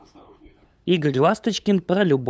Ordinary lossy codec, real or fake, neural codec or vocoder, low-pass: none; fake; codec, 16 kHz, 8 kbps, FunCodec, trained on LibriTTS, 25 frames a second; none